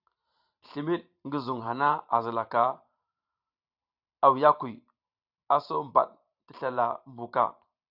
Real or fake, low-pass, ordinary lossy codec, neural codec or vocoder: fake; 5.4 kHz; AAC, 48 kbps; vocoder, 44.1 kHz, 128 mel bands every 512 samples, BigVGAN v2